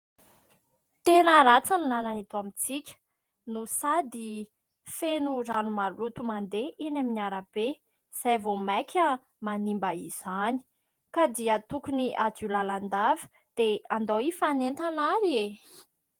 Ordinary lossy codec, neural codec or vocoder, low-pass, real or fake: Opus, 24 kbps; vocoder, 48 kHz, 128 mel bands, Vocos; 19.8 kHz; fake